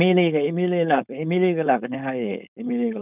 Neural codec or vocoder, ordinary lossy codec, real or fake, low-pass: codec, 16 kHz, 4.8 kbps, FACodec; none; fake; 3.6 kHz